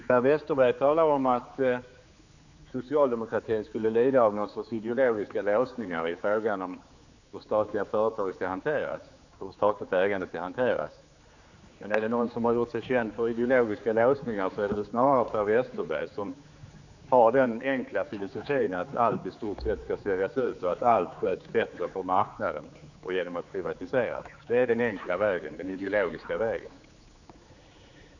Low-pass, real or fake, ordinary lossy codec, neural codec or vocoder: 7.2 kHz; fake; none; codec, 16 kHz, 4 kbps, X-Codec, HuBERT features, trained on general audio